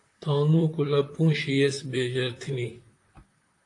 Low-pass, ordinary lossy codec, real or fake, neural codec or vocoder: 10.8 kHz; AAC, 48 kbps; fake; vocoder, 44.1 kHz, 128 mel bands, Pupu-Vocoder